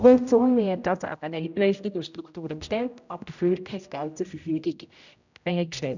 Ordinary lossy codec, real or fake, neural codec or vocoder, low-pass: none; fake; codec, 16 kHz, 0.5 kbps, X-Codec, HuBERT features, trained on general audio; 7.2 kHz